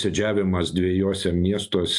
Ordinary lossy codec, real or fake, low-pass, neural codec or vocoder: AAC, 64 kbps; real; 10.8 kHz; none